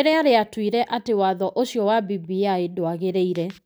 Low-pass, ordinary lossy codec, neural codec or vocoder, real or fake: none; none; none; real